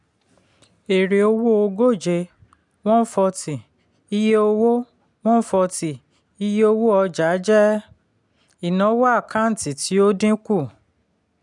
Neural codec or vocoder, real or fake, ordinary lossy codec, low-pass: none; real; none; 10.8 kHz